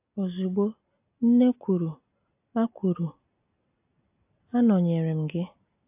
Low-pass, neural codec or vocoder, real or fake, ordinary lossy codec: 3.6 kHz; none; real; AAC, 32 kbps